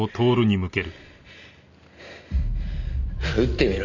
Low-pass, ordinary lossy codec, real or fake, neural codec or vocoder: 7.2 kHz; none; real; none